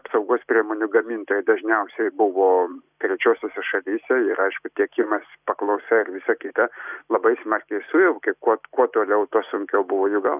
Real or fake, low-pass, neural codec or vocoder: real; 3.6 kHz; none